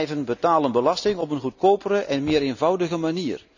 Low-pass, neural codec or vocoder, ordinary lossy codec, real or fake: 7.2 kHz; none; none; real